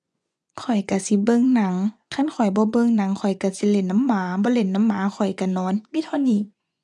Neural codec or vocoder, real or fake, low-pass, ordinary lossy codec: vocoder, 24 kHz, 100 mel bands, Vocos; fake; none; none